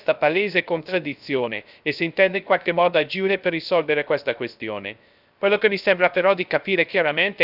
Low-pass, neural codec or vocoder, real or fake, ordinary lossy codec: 5.4 kHz; codec, 16 kHz, 0.3 kbps, FocalCodec; fake; none